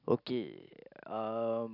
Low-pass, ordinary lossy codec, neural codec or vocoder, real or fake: 5.4 kHz; none; none; real